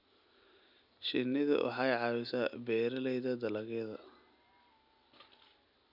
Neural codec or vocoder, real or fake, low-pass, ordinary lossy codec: none; real; 5.4 kHz; none